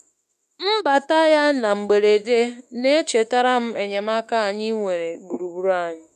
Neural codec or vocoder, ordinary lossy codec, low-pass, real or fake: autoencoder, 48 kHz, 32 numbers a frame, DAC-VAE, trained on Japanese speech; none; 14.4 kHz; fake